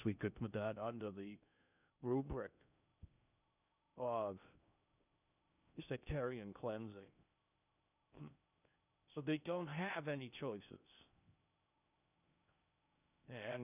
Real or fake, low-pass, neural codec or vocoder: fake; 3.6 kHz; codec, 16 kHz in and 24 kHz out, 0.6 kbps, FocalCodec, streaming, 2048 codes